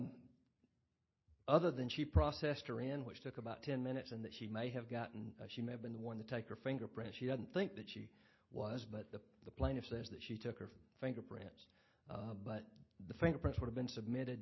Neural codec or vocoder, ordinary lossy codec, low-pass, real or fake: none; MP3, 24 kbps; 7.2 kHz; real